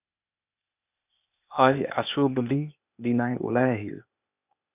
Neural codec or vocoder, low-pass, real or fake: codec, 16 kHz, 0.8 kbps, ZipCodec; 3.6 kHz; fake